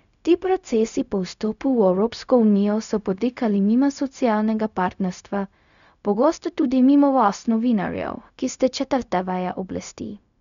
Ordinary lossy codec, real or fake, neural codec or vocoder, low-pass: none; fake; codec, 16 kHz, 0.4 kbps, LongCat-Audio-Codec; 7.2 kHz